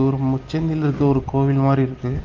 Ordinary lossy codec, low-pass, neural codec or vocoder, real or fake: Opus, 32 kbps; 7.2 kHz; none; real